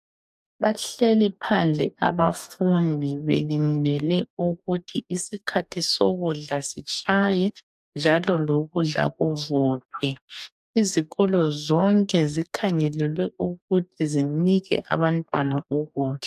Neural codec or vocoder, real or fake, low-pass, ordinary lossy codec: codec, 44.1 kHz, 2.6 kbps, DAC; fake; 14.4 kHz; AAC, 96 kbps